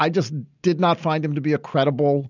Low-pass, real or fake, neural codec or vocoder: 7.2 kHz; real; none